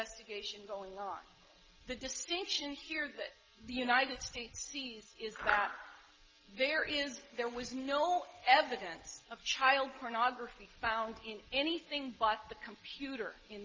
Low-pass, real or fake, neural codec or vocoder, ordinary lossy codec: 7.2 kHz; real; none; Opus, 24 kbps